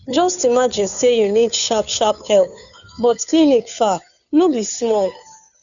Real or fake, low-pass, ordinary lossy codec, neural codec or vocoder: fake; 7.2 kHz; none; codec, 16 kHz, 2 kbps, FunCodec, trained on Chinese and English, 25 frames a second